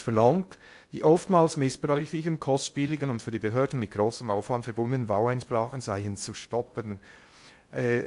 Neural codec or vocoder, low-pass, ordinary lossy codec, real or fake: codec, 16 kHz in and 24 kHz out, 0.6 kbps, FocalCodec, streaming, 2048 codes; 10.8 kHz; AAC, 64 kbps; fake